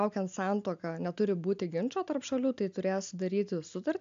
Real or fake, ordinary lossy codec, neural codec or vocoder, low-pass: real; AAC, 64 kbps; none; 7.2 kHz